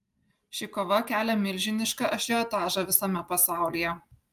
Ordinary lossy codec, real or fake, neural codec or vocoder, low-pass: Opus, 32 kbps; real; none; 14.4 kHz